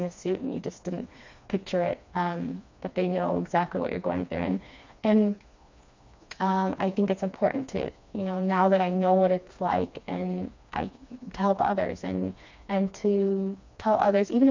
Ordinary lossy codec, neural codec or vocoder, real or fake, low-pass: MP3, 64 kbps; codec, 16 kHz, 2 kbps, FreqCodec, smaller model; fake; 7.2 kHz